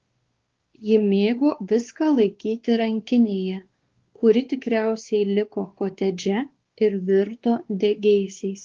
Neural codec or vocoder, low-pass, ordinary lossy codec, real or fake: codec, 16 kHz, 2 kbps, X-Codec, WavLM features, trained on Multilingual LibriSpeech; 7.2 kHz; Opus, 16 kbps; fake